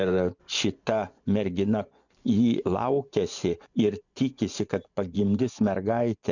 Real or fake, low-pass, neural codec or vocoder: fake; 7.2 kHz; vocoder, 24 kHz, 100 mel bands, Vocos